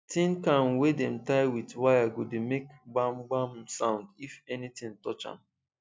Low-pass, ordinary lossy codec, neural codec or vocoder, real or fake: none; none; none; real